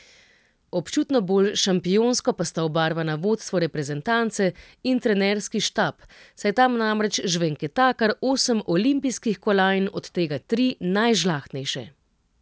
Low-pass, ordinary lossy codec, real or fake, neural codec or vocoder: none; none; real; none